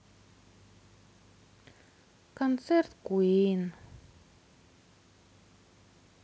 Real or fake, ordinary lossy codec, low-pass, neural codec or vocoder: real; none; none; none